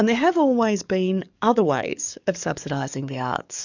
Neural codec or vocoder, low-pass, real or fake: codec, 44.1 kHz, 7.8 kbps, DAC; 7.2 kHz; fake